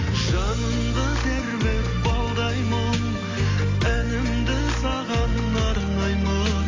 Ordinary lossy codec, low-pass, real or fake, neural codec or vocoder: AAC, 32 kbps; 7.2 kHz; real; none